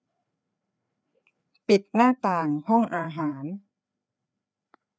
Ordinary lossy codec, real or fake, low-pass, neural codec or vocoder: none; fake; none; codec, 16 kHz, 4 kbps, FreqCodec, larger model